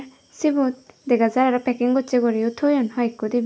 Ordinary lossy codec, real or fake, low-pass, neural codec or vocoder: none; real; none; none